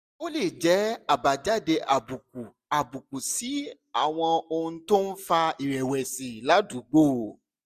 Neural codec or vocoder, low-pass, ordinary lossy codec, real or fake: none; 14.4 kHz; none; real